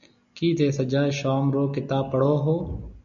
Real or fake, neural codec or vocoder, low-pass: real; none; 7.2 kHz